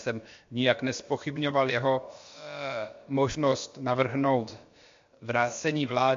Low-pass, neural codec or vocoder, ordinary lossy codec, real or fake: 7.2 kHz; codec, 16 kHz, about 1 kbps, DyCAST, with the encoder's durations; MP3, 48 kbps; fake